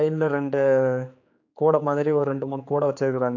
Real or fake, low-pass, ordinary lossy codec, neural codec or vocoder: fake; 7.2 kHz; AAC, 48 kbps; codec, 16 kHz, 4 kbps, X-Codec, HuBERT features, trained on general audio